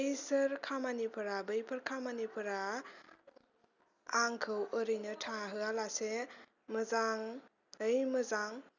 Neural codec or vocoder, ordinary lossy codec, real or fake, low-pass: none; none; real; 7.2 kHz